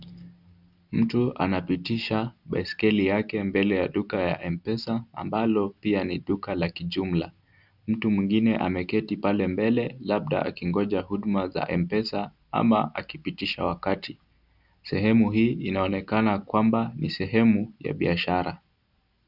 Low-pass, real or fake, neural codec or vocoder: 5.4 kHz; real; none